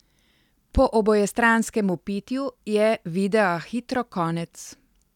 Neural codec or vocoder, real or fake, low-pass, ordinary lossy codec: none; real; 19.8 kHz; none